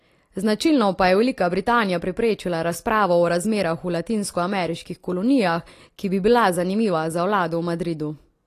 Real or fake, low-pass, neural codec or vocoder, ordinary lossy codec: real; 14.4 kHz; none; AAC, 48 kbps